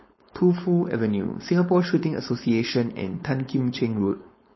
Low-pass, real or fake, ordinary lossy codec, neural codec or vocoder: 7.2 kHz; fake; MP3, 24 kbps; codec, 16 kHz, 4.8 kbps, FACodec